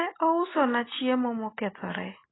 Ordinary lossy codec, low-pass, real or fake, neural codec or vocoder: AAC, 16 kbps; 7.2 kHz; real; none